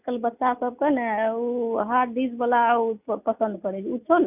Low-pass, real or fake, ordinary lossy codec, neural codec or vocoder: 3.6 kHz; real; none; none